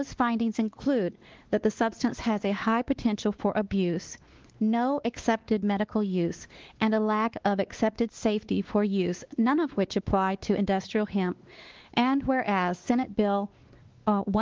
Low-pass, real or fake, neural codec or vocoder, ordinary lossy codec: 7.2 kHz; fake; codec, 16 kHz, 2 kbps, X-Codec, WavLM features, trained on Multilingual LibriSpeech; Opus, 16 kbps